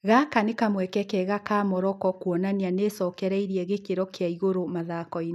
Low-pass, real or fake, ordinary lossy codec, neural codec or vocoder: 14.4 kHz; real; none; none